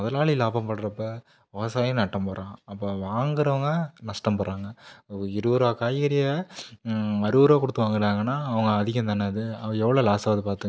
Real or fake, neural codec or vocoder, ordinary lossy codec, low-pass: real; none; none; none